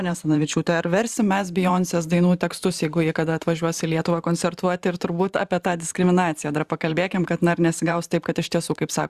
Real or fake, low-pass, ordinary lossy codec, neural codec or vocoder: fake; 14.4 kHz; Opus, 64 kbps; vocoder, 44.1 kHz, 128 mel bands every 512 samples, BigVGAN v2